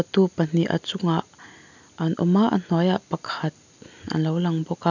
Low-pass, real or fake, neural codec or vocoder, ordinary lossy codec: 7.2 kHz; real; none; none